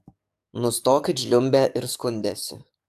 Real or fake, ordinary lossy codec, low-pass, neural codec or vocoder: fake; AAC, 96 kbps; 14.4 kHz; codec, 44.1 kHz, 7.8 kbps, DAC